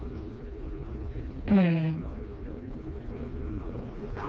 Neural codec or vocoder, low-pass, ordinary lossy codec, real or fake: codec, 16 kHz, 2 kbps, FreqCodec, smaller model; none; none; fake